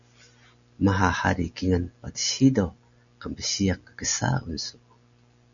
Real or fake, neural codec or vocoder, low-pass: real; none; 7.2 kHz